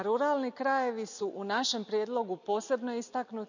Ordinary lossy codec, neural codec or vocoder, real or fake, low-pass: none; none; real; 7.2 kHz